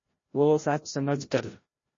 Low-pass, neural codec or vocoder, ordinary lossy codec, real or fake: 7.2 kHz; codec, 16 kHz, 0.5 kbps, FreqCodec, larger model; MP3, 32 kbps; fake